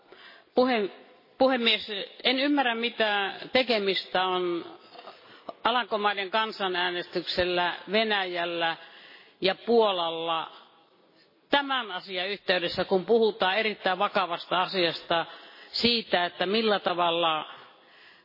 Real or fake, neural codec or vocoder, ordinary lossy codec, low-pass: real; none; MP3, 24 kbps; 5.4 kHz